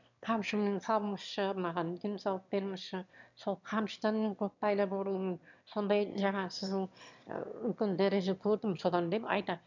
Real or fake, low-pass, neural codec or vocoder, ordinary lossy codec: fake; 7.2 kHz; autoencoder, 22.05 kHz, a latent of 192 numbers a frame, VITS, trained on one speaker; none